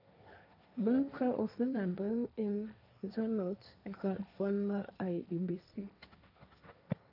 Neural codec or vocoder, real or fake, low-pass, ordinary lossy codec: codec, 16 kHz, 1.1 kbps, Voila-Tokenizer; fake; 5.4 kHz; none